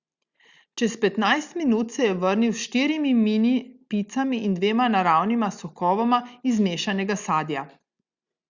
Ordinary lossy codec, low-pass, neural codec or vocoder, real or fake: Opus, 64 kbps; 7.2 kHz; none; real